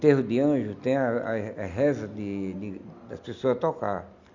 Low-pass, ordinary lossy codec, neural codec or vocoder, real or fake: 7.2 kHz; none; none; real